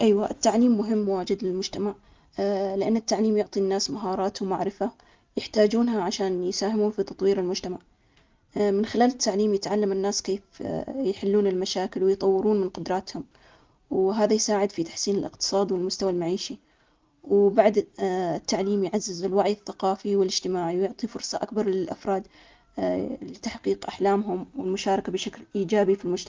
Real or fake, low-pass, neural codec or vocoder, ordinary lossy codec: real; 7.2 kHz; none; Opus, 32 kbps